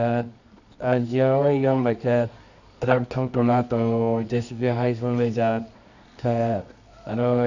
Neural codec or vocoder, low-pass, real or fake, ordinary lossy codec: codec, 24 kHz, 0.9 kbps, WavTokenizer, medium music audio release; 7.2 kHz; fake; none